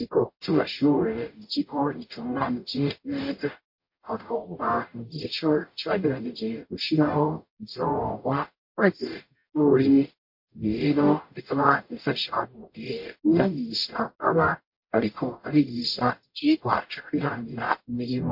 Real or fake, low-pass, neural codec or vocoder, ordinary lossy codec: fake; 5.4 kHz; codec, 44.1 kHz, 0.9 kbps, DAC; MP3, 32 kbps